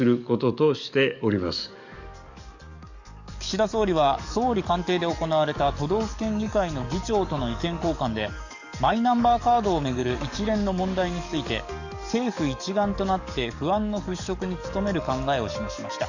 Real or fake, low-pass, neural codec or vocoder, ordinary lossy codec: fake; 7.2 kHz; codec, 44.1 kHz, 7.8 kbps, DAC; none